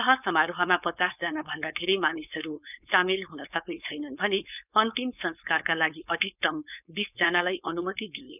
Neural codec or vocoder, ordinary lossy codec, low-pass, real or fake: codec, 16 kHz, 4.8 kbps, FACodec; none; 3.6 kHz; fake